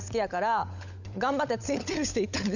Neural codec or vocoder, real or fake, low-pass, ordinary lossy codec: codec, 16 kHz, 16 kbps, FunCodec, trained on LibriTTS, 50 frames a second; fake; 7.2 kHz; none